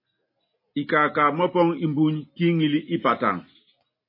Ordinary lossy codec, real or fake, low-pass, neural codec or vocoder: MP3, 24 kbps; real; 5.4 kHz; none